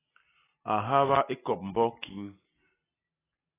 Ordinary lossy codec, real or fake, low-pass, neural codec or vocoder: AAC, 16 kbps; real; 3.6 kHz; none